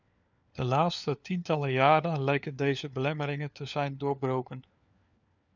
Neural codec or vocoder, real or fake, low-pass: codec, 16 kHz, 4 kbps, FunCodec, trained on LibriTTS, 50 frames a second; fake; 7.2 kHz